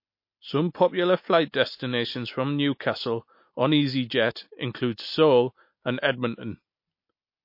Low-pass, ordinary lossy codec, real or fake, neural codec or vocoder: 5.4 kHz; MP3, 32 kbps; real; none